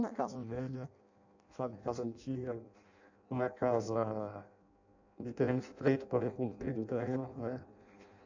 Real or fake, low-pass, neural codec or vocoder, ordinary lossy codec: fake; 7.2 kHz; codec, 16 kHz in and 24 kHz out, 0.6 kbps, FireRedTTS-2 codec; none